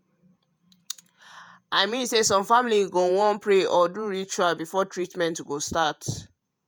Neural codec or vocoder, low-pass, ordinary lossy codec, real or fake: vocoder, 48 kHz, 128 mel bands, Vocos; none; none; fake